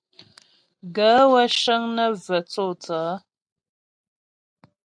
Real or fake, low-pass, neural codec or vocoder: real; 9.9 kHz; none